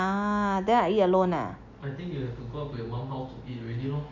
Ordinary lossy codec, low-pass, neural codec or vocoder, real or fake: none; 7.2 kHz; none; real